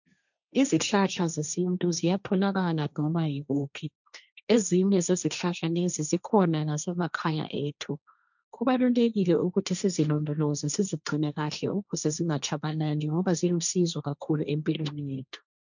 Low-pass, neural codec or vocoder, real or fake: 7.2 kHz; codec, 16 kHz, 1.1 kbps, Voila-Tokenizer; fake